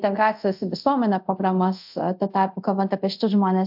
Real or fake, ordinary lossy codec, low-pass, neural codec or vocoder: fake; AAC, 48 kbps; 5.4 kHz; codec, 24 kHz, 0.5 kbps, DualCodec